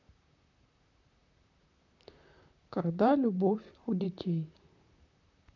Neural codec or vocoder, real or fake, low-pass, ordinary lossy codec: vocoder, 44.1 kHz, 128 mel bands, Pupu-Vocoder; fake; 7.2 kHz; none